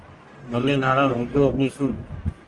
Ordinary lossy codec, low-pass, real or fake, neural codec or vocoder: Opus, 24 kbps; 10.8 kHz; fake; codec, 44.1 kHz, 1.7 kbps, Pupu-Codec